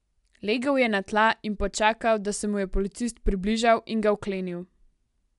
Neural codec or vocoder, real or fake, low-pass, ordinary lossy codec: none; real; 10.8 kHz; MP3, 96 kbps